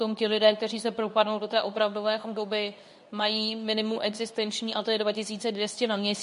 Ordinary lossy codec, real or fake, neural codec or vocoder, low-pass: MP3, 48 kbps; fake; codec, 24 kHz, 0.9 kbps, WavTokenizer, medium speech release version 2; 10.8 kHz